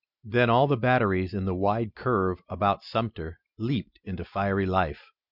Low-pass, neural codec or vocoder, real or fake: 5.4 kHz; none; real